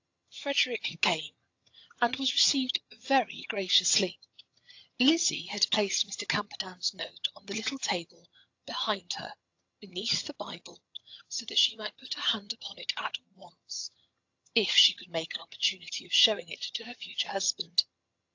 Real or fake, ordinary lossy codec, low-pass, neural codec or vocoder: fake; AAC, 48 kbps; 7.2 kHz; vocoder, 22.05 kHz, 80 mel bands, HiFi-GAN